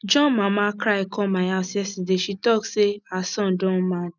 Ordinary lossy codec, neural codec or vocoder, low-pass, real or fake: none; none; 7.2 kHz; real